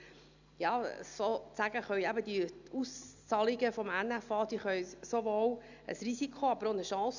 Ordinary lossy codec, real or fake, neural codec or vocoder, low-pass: none; real; none; 7.2 kHz